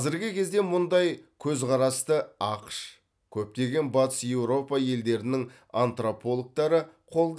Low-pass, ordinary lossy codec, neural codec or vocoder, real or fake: none; none; none; real